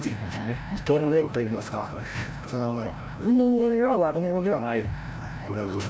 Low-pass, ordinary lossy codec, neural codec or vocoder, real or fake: none; none; codec, 16 kHz, 0.5 kbps, FreqCodec, larger model; fake